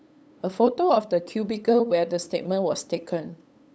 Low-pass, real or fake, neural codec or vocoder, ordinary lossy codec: none; fake; codec, 16 kHz, 8 kbps, FunCodec, trained on LibriTTS, 25 frames a second; none